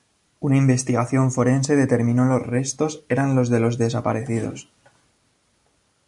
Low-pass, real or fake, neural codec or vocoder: 10.8 kHz; real; none